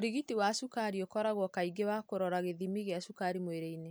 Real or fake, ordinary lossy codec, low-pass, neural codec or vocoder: real; none; none; none